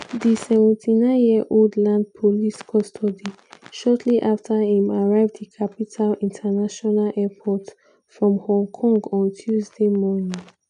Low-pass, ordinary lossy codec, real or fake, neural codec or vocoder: 9.9 kHz; none; real; none